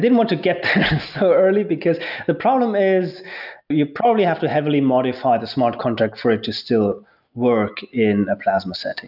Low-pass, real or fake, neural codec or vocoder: 5.4 kHz; real; none